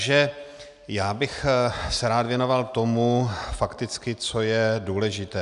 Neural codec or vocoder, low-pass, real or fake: none; 10.8 kHz; real